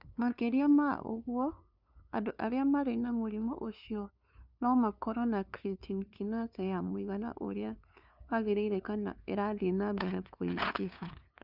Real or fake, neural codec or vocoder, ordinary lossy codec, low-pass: fake; codec, 16 kHz, 2 kbps, FunCodec, trained on LibriTTS, 25 frames a second; none; 5.4 kHz